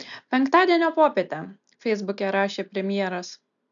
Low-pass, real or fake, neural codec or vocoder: 7.2 kHz; real; none